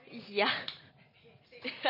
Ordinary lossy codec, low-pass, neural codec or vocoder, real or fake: MP3, 24 kbps; 5.4 kHz; none; real